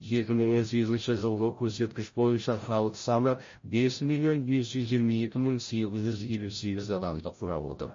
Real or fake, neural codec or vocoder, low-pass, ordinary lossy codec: fake; codec, 16 kHz, 0.5 kbps, FreqCodec, larger model; 7.2 kHz; MP3, 32 kbps